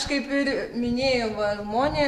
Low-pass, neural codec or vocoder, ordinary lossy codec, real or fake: 14.4 kHz; none; AAC, 64 kbps; real